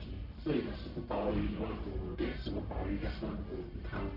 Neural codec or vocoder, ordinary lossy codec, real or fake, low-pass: codec, 44.1 kHz, 1.7 kbps, Pupu-Codec; none; fake; 5.4 kHz